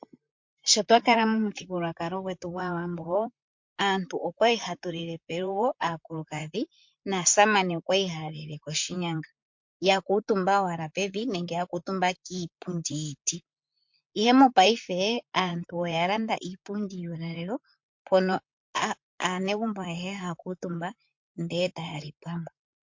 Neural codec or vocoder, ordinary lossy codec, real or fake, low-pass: vocoder, 44.1 kHz, 128 mel bands, Pupu-Vocoder; MP3, 48 kbps; fake; 7.2 kHz